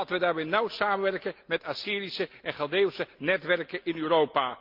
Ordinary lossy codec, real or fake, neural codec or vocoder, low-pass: Opus, 32 kbps; real; none; 5.4 kHz